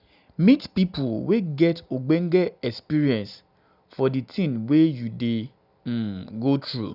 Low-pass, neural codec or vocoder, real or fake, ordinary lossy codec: 5.4 kHz; none; real; none